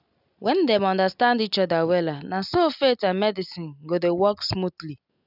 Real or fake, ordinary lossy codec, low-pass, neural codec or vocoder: real; none; 5.4 kHz; none